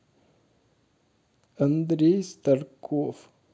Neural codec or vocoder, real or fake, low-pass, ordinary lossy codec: none; real; none; none